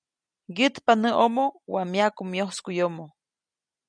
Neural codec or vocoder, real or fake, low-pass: none; real; 9.9 kHz